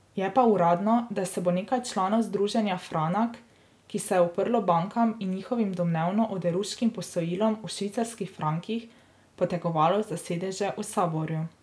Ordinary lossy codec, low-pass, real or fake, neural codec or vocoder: none; none; real; none